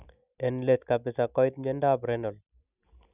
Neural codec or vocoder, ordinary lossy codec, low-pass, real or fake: none; none; 3.6 kHz; real